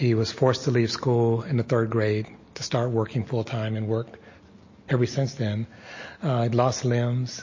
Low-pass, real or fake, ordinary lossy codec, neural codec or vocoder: 7.2 kHz; real; MP3, 32 kbps; none